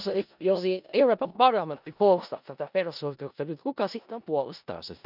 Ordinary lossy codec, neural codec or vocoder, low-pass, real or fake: none; codec, 16 kHz in and 24 kHz out, 0.4 kbps, LongCat-Audio-Codec, four codebook decoder; 5.4 kHz; fake